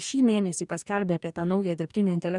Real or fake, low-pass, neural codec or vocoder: fake; 10.8 kHz; codec, 44.1 kHz, 2.6 kbps, DAC